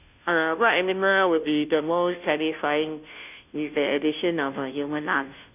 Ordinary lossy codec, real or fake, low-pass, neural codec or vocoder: none; fake; 3.6 kHz; codec, 16 kHz, 0.5 kbps, FunCodec, trained on Chinese and English, 25 frames a second